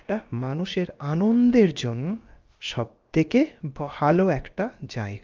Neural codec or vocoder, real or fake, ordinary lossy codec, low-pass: codec, 16 kHz, about 1 kbps, DyCAST, with the encoder's durations; fake; Opus, 32 kbps; 7.2 kHz